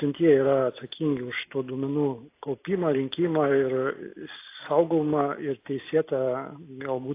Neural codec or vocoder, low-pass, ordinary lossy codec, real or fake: none; 3.6 kHz; AAC, 24 kbps; real